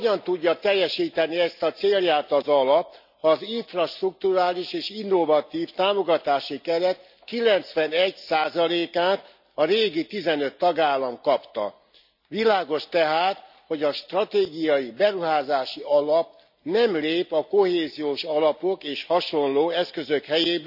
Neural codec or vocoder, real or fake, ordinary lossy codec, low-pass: none; real; none; 5.4 kHz